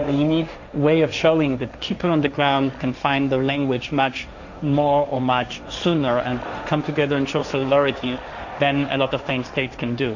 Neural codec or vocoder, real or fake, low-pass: codec, 16 kHz, 1.1 kbps, Voila-Tokenizer; fake; 7.2 kHz